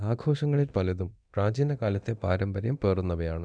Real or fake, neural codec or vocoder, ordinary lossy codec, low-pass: fake; codec, 24 kHz, 0.9 kbps, DualCodec; none; 9.9 kHz